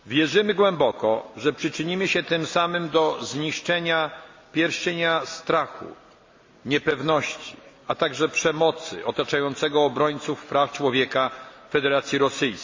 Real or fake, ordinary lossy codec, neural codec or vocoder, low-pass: real; MP3, 48 kbps; none; 7.2 kHz